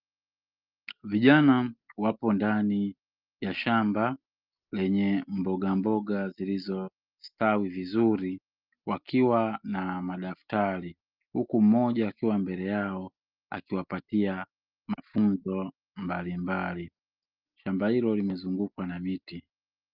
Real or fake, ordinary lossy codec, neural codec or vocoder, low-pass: real; Opus, 32 kbps; none; 5.4 kHz